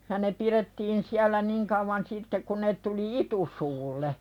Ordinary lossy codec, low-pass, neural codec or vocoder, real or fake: none; 19.8 kHz; none; real